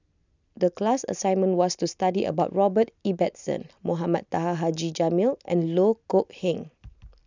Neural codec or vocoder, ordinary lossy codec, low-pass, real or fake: none; none; 7.2 kHz; real